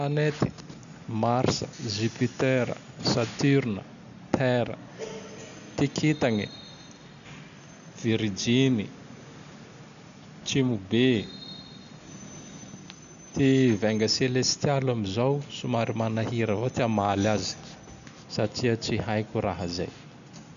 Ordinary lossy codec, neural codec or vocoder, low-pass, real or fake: none; none; 7.2 kHz; real